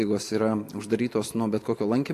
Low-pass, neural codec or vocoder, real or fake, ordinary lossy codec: 14.4 kHz; none; real; AAC, 64 kbps